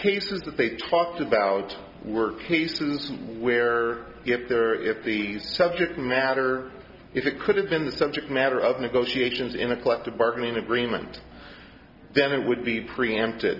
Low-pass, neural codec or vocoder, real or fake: 5.4 kHz; none; real